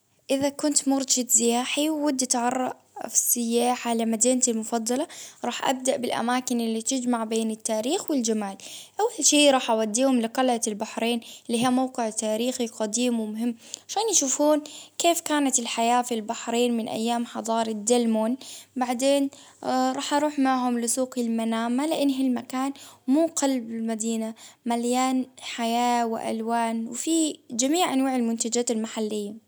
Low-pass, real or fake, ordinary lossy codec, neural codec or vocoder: none; real; none; none